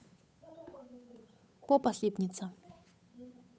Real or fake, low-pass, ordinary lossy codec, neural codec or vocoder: fake; none; none; codec, 16 kHz, 8 kbps, FunCodec, trained on Chinese and English, 25 frames a second